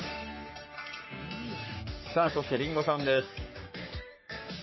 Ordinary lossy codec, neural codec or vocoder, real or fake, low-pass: MP3, 24 kbps; codec, 44.1 kHz, 3.4 kbps, Pupu-Codec; fake; 7.2 kHz